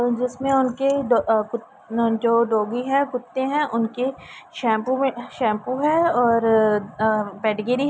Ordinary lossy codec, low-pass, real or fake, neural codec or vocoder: none; none; real; none